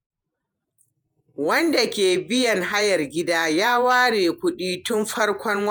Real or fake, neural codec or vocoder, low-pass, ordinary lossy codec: fake; vocoder, 48 kHz, 128 mel bands, Vocos; none; none